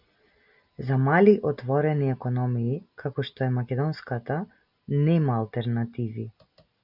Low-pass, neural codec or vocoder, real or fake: 5.4 kHz; none; real